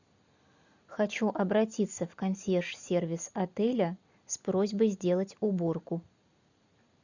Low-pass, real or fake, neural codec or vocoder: 7.2 kHz; real; none